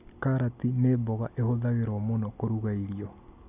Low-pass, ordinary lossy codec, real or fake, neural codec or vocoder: 3.6 kHz; none; real; none